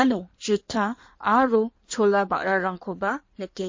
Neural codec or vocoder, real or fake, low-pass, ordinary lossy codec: codec, 16 kHz in and 24 kHz out, 1.1 kbps, FireRedTTS-2 codec; fake; 7.2 kHz; MP3, 32 kbps